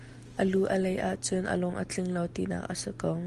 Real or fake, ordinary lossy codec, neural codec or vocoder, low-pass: real; Opus, 24 kbps; none; 10.8 kHz